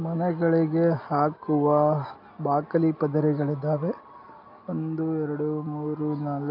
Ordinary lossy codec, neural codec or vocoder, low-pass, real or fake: none; none; 5.4 kHz; real